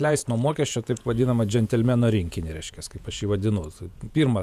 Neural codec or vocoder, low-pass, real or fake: vocoder, 48 kHz, 128 mel bands, Vocos; 14.4 kHz; fake